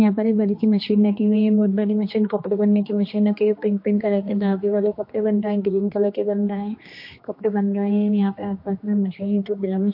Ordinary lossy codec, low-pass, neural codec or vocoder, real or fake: MP3, 32 kbps; 5.4 kHz; codec, 16 kHz, 2 kbps, X-Codec, HuBERT features, trained on general audio; fake